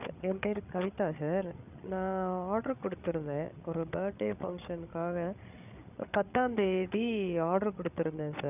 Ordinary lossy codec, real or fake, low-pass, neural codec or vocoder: none; fake; 3.6 kHz; codec, 16 kHz, 8 kbps, FreqCodec, larger model